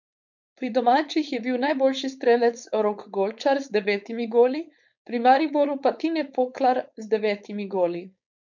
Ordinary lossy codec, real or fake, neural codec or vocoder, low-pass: none; fake; codec, 16 kHz, 4.8 kbps, FACodec; 7.2 kHz